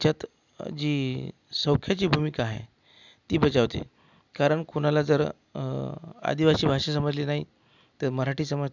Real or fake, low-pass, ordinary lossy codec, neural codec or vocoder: real; 7.2 kHz; none; none